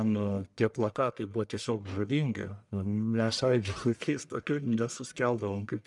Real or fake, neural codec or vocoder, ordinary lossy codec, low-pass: fake; codec, 44.1 kHz, 1.7 kbps, Pupu-Codec; MP3, 96 kbps; 10.8 kHz